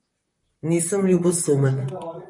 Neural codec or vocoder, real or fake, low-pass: vocoder, 44.1 kHz, 128 mel bands, Pupu-Vocoder; fake; 10.8 kHz